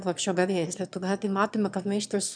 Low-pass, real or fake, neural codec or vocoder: 9.9 kHz; fake; autoencoder, 22.05 kHz, a latent of 192 numbers a frame, VITS, trained on one speaker